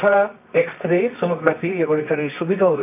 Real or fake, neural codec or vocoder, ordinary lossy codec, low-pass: fake; codec, 24 kHz, 0.9 kbps, WavTokenizer, medium music audio release; none; 3.6 kHz